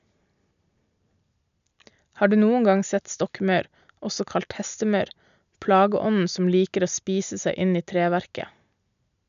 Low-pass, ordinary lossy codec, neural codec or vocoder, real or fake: 7.2 kHz; none; none; real